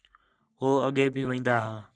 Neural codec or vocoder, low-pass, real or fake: codec, 44.1 kHz, 3.4 kbps, Pupu-Codec; 9.9 kHz; fake